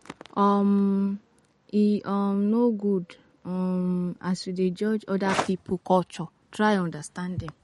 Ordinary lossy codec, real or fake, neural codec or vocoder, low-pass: MP3, 48 kbps; real; none; 19.8 kHz